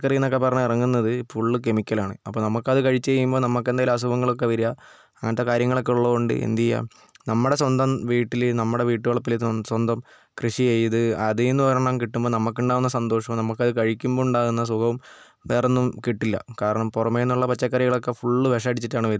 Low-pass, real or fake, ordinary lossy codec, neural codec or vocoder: none; real; none; none